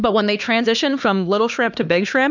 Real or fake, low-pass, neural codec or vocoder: fake; 7.2 kHz; codec, 16 kHz, 2 kbps, X-Codec, HuBERT features, trained on LibriSpeech